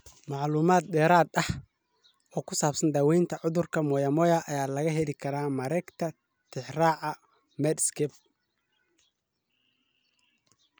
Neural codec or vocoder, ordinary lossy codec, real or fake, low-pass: none; none; real; none